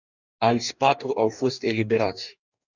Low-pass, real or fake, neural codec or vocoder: 7.2 kHz; fake; codec, 44.1 kHz, 2.6 kbps, DAC